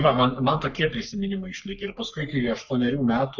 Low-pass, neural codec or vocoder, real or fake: 7.2 kHz; codec, 44.1 kHz, 3.4 kbps, Pupu-Codec; fake